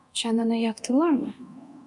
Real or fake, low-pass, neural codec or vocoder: fake; 10.8 kHz; codec, 24 kHz, 0.9 kbps, DualCodec